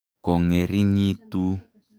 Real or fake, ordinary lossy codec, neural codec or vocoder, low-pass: fake; none; codec, 44.1 kHz, 7.8 kbps, DAC; none